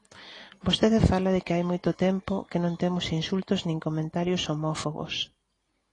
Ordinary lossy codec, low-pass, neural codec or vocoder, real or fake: AAC, 32 kbps; 10.8 kHz; none; real